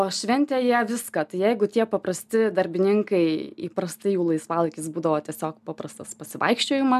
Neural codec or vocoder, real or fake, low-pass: none; real; 14.4 kHz